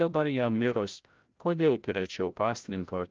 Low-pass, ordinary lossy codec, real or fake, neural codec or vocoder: 7.2 kHz; Opus, 24 kbps; fake; codec, 16 kHz, 0.5 kbps, FreqCodec, larger model